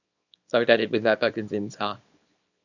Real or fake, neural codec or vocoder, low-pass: fake; codec, 24 kHz, 0.9 kbps, WavTokenizer, small release; 7.2 kHz